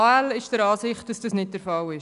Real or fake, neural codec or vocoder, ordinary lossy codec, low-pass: real; none; none; 10.8 kHz